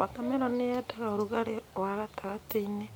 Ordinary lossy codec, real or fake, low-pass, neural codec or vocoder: none; real; none; none